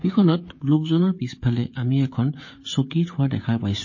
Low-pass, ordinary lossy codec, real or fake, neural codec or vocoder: 7.2 kHz; MP3, 32 kbps; fake; codec, 16 kHz, 16 kbps, FreqCodec, smaller model